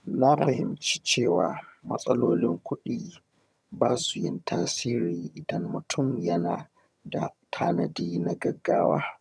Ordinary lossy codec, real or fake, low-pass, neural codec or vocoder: none; fake; none; vocoder, 22.05 kHz, 80 mel bands, HiFi-GAN